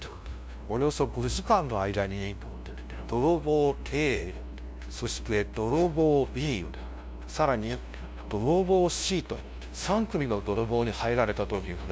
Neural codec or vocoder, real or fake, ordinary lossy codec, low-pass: codec, 16 kHz, 0.5 kbps, FunCodec, trained on LibriTTS, 25 frames a second; fake; none; none